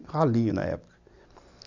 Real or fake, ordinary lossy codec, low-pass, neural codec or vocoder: real; none; 7.2 kHz; none